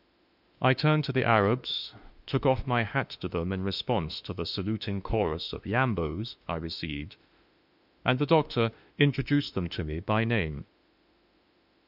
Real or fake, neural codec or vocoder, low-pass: fake; autoencoder, 48 kHz, 32 numbers a frame, DAC-VAE, trained on Japanese speech; 5.4 kHz